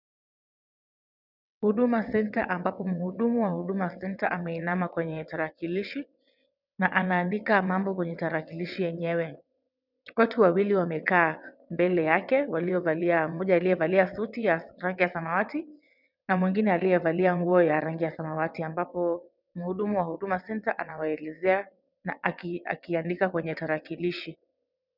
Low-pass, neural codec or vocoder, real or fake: 5.4 kHz; vocoder, 22.05 kHz, 80 mel bands, WaveNeXt; fake